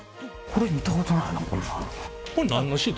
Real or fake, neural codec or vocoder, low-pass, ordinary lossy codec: real; none; none; none